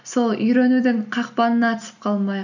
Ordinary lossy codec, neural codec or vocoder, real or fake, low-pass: none; none; real; 7.2 kHz